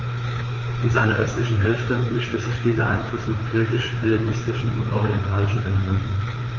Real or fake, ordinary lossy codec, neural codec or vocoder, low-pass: fake; Opus, 32 kbps; codec, 16 kHz, 4 kbps, FreqCodec, larger model; 7.2 kHz